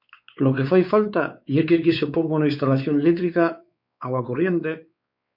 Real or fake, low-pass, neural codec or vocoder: fake; 5.4 kHz; codec, 16 kHz, 4 kbps, X-Codec, WavLM features, trained on Multilingual LibriSpeech